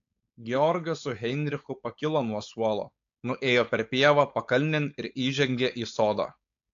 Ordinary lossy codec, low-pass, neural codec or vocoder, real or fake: AAC, 64 kbps; 7.2 kHz; codec, 16 kHz, 4.8 kbps, FACodec; fake